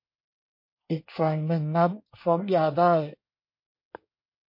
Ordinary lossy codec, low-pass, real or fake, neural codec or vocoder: MP3, 32 kbps; 5.4 kHz; fake; codec, 24 kHz, 1 kbps, SNAC